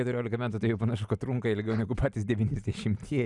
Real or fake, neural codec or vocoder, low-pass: real; none; 10.8 kHz